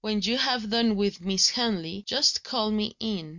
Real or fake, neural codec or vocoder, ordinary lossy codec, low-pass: real; none; Opus, 64 kbps; 7.2 kHz